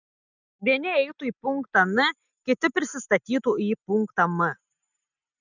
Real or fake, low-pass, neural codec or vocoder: real; 7.2 kHz; none